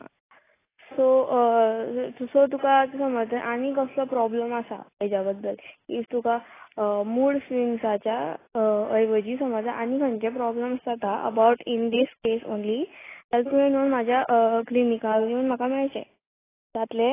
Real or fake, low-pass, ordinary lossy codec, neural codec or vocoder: real; 3.6 kHz; AAC, 16 kbps; none